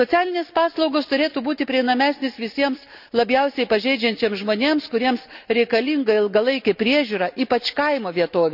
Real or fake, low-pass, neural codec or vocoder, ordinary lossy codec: real; 5.4 kHz; none; none